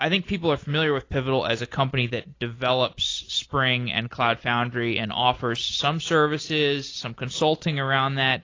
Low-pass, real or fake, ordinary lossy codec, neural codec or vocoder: 7.2 kHz; real; AAC, 32 kbps; none